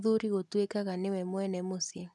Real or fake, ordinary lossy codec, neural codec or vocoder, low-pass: real; none; none; none